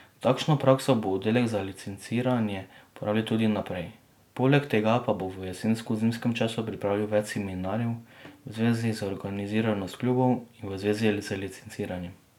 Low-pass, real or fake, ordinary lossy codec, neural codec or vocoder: 19.8 kHz; real; none; none